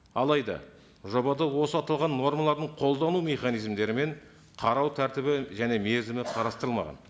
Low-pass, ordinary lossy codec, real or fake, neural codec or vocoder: none; none; real; none